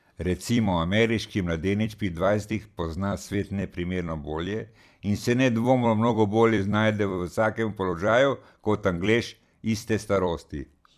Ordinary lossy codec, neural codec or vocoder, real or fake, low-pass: none; vocoder, 44.1 kHz, 128 mel bands every 256 samples, BigVGAN v2; fake; 14.4 kHz